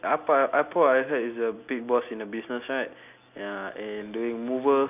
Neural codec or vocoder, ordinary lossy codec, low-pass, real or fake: none; none; 3.6 kHz; real